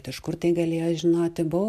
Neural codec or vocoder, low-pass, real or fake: none; 14.4 kHz; real